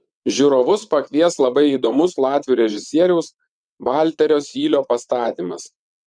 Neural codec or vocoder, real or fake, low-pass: vocoder, 22.05 kHz, 80 mel bands, WaveNeXt; fake; 9.9 kHz